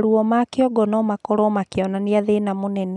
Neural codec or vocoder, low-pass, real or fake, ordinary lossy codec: none; 10.8 kHz; real; none